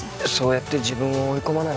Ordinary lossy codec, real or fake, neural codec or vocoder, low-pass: none; real; none; none